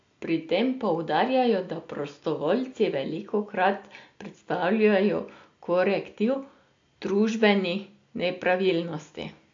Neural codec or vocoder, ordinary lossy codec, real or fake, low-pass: none; AAC, 48 kbps; real; 7.2 kHz